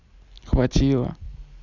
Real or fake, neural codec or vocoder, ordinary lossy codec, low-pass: real; none; none; 7.2 kHz